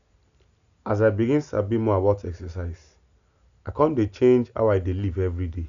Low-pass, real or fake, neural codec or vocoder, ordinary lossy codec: 7.2 kHz; real; none; none